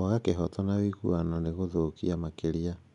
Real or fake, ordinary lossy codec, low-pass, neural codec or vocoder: real; none; 10.8 kHz; none